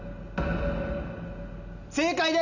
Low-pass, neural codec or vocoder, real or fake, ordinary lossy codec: 7.2 kHz; none; real; none